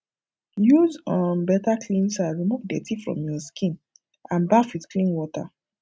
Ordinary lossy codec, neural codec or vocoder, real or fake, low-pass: none; none; real; none